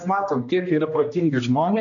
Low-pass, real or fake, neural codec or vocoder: 7.2 kHz; fake; codec, 16 kHz, 1 kbps, X-Codec, HuBERT features, trained on general audio